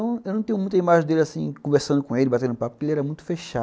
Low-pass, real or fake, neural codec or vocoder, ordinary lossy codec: none; real; none; none